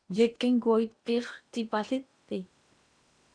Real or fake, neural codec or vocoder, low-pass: fake; codec, 16 kHz in and 24 kHz out, 0.8 kbps, FocalCodec, streaming, 65536 codes; 9.9 kHz